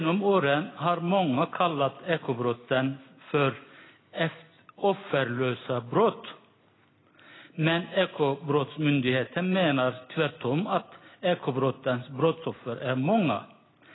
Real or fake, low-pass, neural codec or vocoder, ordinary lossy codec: real; 7.2 kHz; none; AAC, 16 kbps